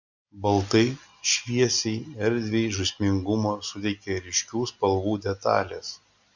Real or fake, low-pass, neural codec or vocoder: real; 7.2 kHz; none